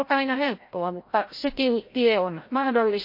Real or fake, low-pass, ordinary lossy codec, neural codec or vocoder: fake; 5.4 kHz; MP3, 32 kbps; codec, 16 kHz, 0.5 kbps, FreqCodec, larger model